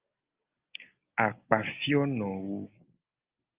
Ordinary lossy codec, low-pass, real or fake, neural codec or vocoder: Opus, 32 kbps; 3.6 kHz; real; none